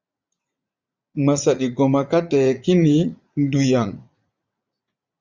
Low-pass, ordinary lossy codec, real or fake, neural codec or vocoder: 7.2 kHz; Opus, 64 kbps; fake; vocoder, 44.1 kHz, 80 mel bands, Vocos